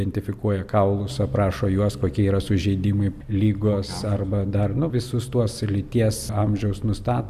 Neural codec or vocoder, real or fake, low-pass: none; real; 14.4 kHz